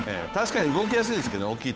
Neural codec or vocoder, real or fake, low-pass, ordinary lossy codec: codec, 16 kHz, 8 kbps, FunCodec, trained on Chinese and English, 25 frames a second; fake; none; none